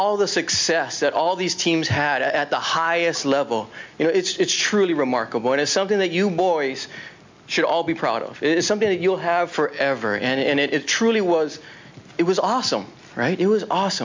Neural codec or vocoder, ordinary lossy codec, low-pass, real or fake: none; MP3, 64 kbps; 7.2 kHz; real